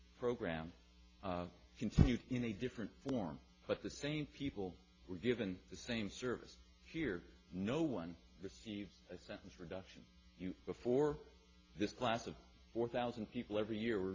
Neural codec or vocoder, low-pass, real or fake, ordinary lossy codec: none; 7.2 kHz; real; AAC, 32 kbps